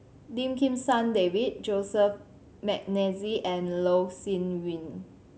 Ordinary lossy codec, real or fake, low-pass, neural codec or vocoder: none; real; none; none